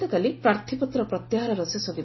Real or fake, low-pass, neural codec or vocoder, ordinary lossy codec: real; 7.2 kHz; none; MP3, 24 kbps